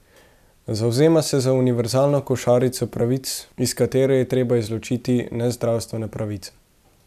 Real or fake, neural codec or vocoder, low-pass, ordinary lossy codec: real; none; 14.4 kHz; none